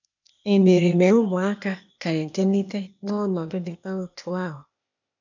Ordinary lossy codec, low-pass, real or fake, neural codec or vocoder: none; 7.2 kHz; fake; codec, 16 kHz, 0.8 kbps, ZipCodec